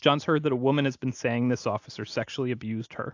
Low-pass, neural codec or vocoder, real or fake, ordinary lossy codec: 7.2 kHz; none; real; AAC, 48 kbps